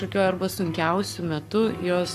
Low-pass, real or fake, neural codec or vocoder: 14.4 kHz; fake; codec, 44.1 kHz, 7.8 kbps, Pupu-Codec